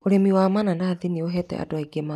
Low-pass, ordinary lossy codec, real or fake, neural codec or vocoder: 14.4 kHz; none; real; none